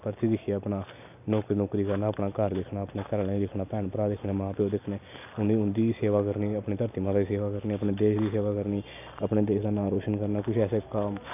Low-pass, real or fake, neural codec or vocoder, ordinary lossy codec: 3.6 kHz; real; none; none